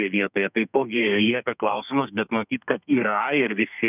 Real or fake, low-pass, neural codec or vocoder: fake; 3.6 kHz; codec, 32 kHz, 1.9 kbps, SNAC